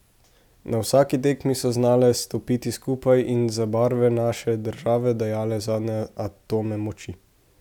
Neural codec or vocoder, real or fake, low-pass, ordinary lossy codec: none; real; 19.8 kHz; none